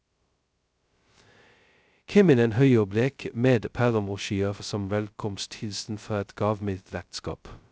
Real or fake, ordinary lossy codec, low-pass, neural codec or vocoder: fake; none; none; codec, 16 kHz, 0.2 kbps, FocalCodec